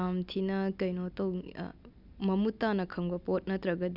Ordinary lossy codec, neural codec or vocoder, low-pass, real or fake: none; none; 5.4 kHz; real